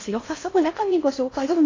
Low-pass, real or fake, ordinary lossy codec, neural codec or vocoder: 7.2 kHz; fake; AAC, 32 kbps; codec, 16 kHz in and 24 kHz out, 0.6 kbps, FocalCodec, streaming, 4096 codes